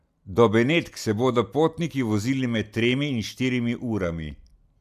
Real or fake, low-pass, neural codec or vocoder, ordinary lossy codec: real; 14.4 kHz; none; none